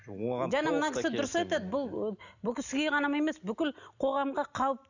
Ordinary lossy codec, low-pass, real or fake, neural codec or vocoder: none; 7.2 kHz; real; none